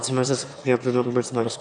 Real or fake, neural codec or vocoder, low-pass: fake; autoencoder, 22.05 kHz, a latent of 192 numbers a frame, VITS, trained on one speaker; 9.9 kHz